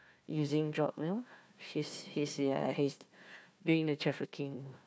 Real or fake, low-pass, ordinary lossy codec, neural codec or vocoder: fake; none; none; codec, 16 kHz, 1 kbps, FunCodec, trained on Chinese and English, 50 frames a second